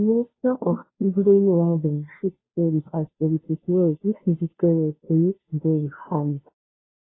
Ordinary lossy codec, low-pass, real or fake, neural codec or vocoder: AAC, 16 kbps; 7.2 kHz; fake; codec, 16 kHz, 0.5 kbps, FunCodec, trained on Chinese and English, 25 frames a second